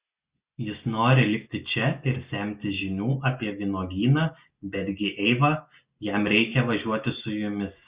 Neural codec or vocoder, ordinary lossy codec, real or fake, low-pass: none; Opus, 64 kbps; real; 3.6 kHz